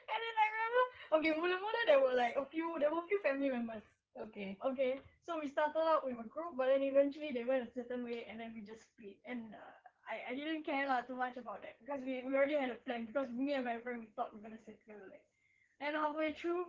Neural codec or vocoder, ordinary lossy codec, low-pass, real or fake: codec, 16 kHz in and 24 kHz out, 2.2 kbps, FireRedTTS-2 codec; Opus, 16 kbps; 7.2 kHz; fake